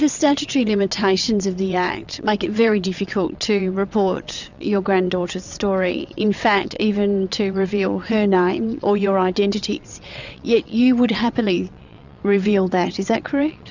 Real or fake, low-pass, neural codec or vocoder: fake; 7.2 kHz; vocoder, 22.05 kHz, 80 mel bands, WaveNeXt